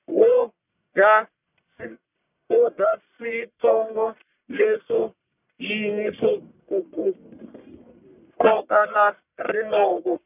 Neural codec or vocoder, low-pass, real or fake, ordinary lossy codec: codec, 44.1 kHz, 1.7 kbps, Pupu-Codec; 3.6 kHz; fake; none